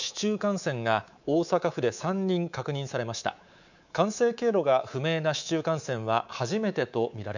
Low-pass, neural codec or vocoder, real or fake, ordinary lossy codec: 7.2 kHz; codec, 24 kHz, 3.1 kbps, DualCodec; fake; none